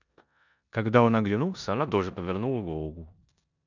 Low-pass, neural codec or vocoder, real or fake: 7.2 kHz; codec, 16 kHz in and 24 kHz out, 0.9 kbps, LongCat-Audio-Codec, four codebook decoder; fake